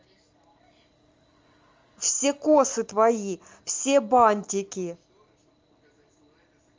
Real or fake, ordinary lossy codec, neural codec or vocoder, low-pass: real; Opus, 32 kbps; none; 7.2 kHz